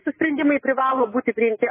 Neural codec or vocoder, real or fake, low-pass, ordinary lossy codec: none; real; 3.6 kHz; MP3, 16 kbps